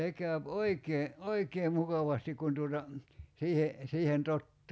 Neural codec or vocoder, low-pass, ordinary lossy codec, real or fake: none; none; none; real